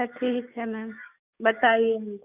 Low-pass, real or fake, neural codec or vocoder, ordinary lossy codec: 3.6 kHz; fake; codec, 44.1 kHz, 7.8 kbps, DAC; none